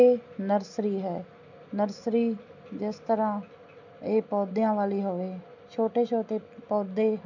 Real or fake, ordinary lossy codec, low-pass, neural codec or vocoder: real; none; 7.2 kHz; none